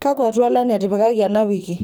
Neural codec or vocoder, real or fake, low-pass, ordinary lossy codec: codec, 44.1 kHz, 2.6 kbps, SNAC; fake; none; none